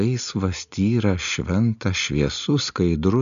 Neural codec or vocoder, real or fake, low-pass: none; real; 7.2 kHz